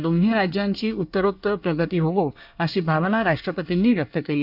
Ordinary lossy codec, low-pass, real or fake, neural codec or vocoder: none; 5.4 kHz; fake; codec, 24 kHz, 1 kbps, SNAC